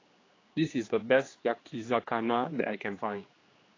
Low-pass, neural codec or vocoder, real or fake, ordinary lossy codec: 7.2 kHz; codec, 16 kHz, 2 kbps, X-Codec, HuBERT features, trained on general audio; fake; AAC, 32 kbps